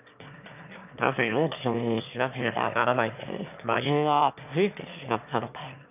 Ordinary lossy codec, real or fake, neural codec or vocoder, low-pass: none; fake; autoencoder, 22.05 kHz, a latent of 192 numbers a frame, VITS, trained on one speaker; 3.6 kHz